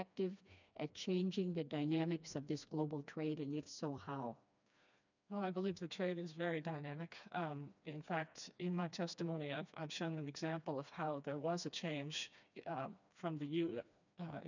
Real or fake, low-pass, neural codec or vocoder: fake; 7.2 kHz; codec, 16 kHz, 2 kbps, FreqCodec, smaller model